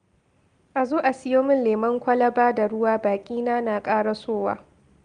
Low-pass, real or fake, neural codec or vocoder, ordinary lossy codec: 9.9 kHz; real; none; Opus, 24 kbps